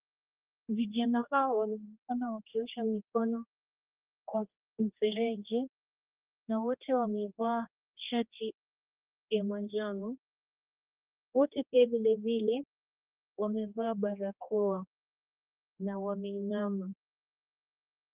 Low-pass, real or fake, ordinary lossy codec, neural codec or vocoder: 3.6 kHz; fake; Opus, 32 kbps; codec, 16 kHz, 2 kbps, X-Codec, HuBERT features, trained on general audio